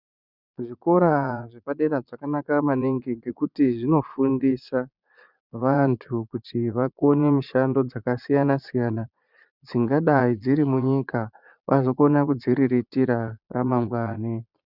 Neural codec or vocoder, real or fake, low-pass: vocoder, 22.05 kHz, 80 mel bands, Vocos; fake; 5.4 kHz